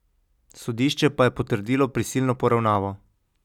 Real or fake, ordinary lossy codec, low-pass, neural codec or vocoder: real; none; 19.8 kHz; none